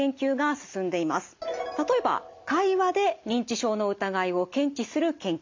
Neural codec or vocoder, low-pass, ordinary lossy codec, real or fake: none; 7.2 kHz; MP3, 48 kbps; real